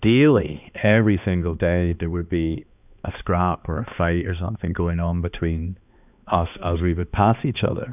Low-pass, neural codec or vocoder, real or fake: 3.6 kHz; codec, 16 kHz, 2 kbps, X-Codec, HuBERT features, trained on balanced general audio; fake